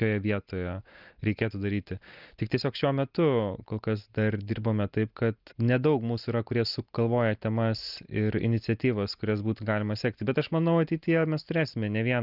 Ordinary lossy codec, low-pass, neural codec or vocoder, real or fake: Opus, 24 kbps; 5.4 kHz; none; real